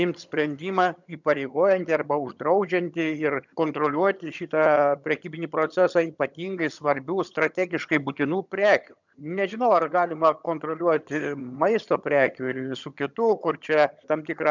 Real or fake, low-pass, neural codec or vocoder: fake; 7.2 kHz; vocoder, 22.05 kHz, 80 mel bands, HiFi-GAN